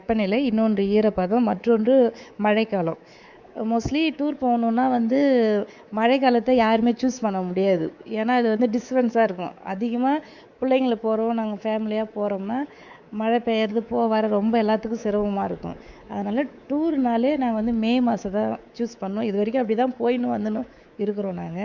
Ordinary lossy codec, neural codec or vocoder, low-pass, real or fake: Opus, 64 kbps; codec, 24 kHz, 3.1 kbps, DualCodec; 7.2 kHz; fake